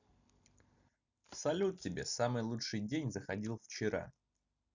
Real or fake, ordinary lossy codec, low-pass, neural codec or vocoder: real; none; 7.2 kHz; none